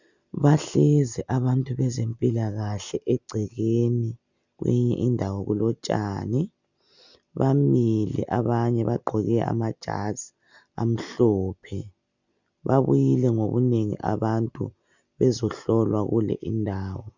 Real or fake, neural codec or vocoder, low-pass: real; none; 7.2 kHz